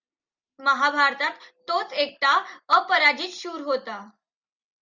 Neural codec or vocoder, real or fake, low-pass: none; real; 7.2 kHz